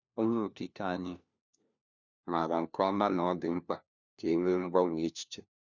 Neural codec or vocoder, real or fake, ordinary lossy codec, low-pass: codec, 16 kHz, 1 kbps, FunCodec, trained on LibriTTS, 50 frames a second; fake; none; 7.2 kHz